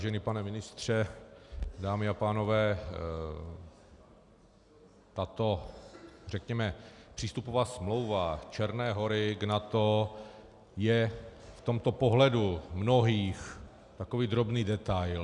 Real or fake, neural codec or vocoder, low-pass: real; none; 10.8 kHz